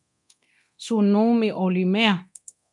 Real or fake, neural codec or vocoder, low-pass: fake; codec, 24 kHz, 0.9 kbps, DualCodec; 10.8 kHz